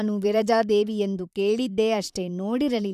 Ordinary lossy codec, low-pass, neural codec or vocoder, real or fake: none; 14.4 kHz; vocoder, 44.1 kHz, 128 mel bands, Pupu-Vocoder; fake